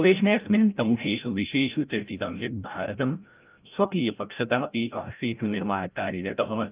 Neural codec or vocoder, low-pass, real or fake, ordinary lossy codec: codec, 16 kHz, 0.5 kbps, FreqCodec, larger model; 3.6 kHz; fake; Opus, 32 kbps